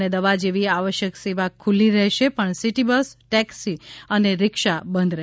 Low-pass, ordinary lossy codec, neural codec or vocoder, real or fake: none; none; none; real